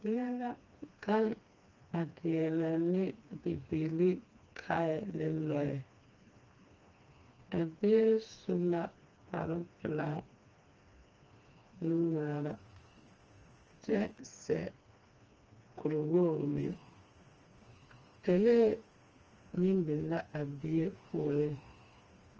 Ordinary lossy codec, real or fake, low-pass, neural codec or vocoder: Opus, 24 kbps; fake; 7.2 kHz; codec, 16 kHz, 2 kbps, FreqCodec, smaller model